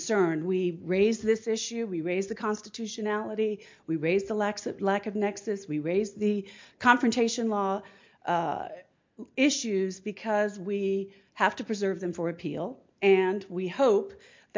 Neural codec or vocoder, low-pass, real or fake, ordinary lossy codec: none; 7.2 kHz; real; MP3, 48 kbps